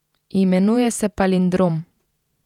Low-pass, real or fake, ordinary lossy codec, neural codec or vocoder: 19.8 kHz; fake; none; vocoder, 48 kHz, 128 mel bands, Vocos